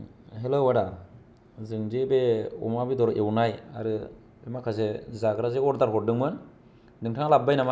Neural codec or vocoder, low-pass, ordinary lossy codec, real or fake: none; none; none; real